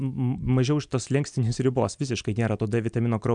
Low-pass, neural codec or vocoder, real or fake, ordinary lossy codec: 9.9 kHz; none; real; MP3, 96 kbps